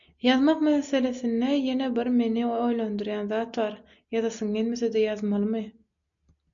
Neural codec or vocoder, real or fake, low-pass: none; real; 7.2 kHz